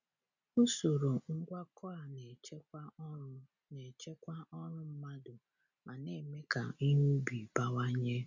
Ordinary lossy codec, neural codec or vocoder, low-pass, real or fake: none; none; 7.2 kHz; real